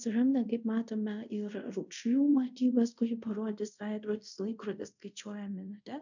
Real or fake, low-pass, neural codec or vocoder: fake; 7.2 kHz; codec, 24 kHz, 0.5 kbps, DualCodec